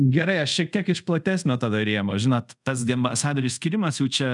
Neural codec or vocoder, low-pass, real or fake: codec, 24 kHz, 0.5 kbps, DualCodec; 10.8 kHz; fake